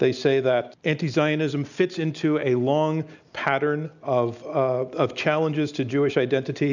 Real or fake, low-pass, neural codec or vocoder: real; 7.2 kHz; none